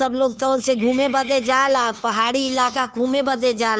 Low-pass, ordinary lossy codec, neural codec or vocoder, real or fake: none; none; codec, 16 kHz, 2 kbps, FunCodec, trained on Chinese and English, 25 frames a second; fake